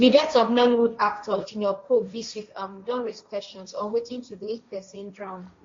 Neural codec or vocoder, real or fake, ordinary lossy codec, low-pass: codec, 16 kHz, 1.1 kbps, Voila-Tokenizer; fake; MP3, 48 kbps; 7.2 kHz